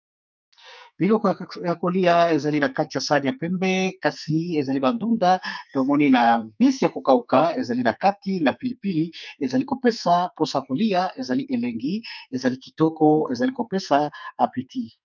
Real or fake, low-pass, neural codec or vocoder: fake; 7.2 kHz; codec, 44.1 kHz, 2.6 kbps, SNAC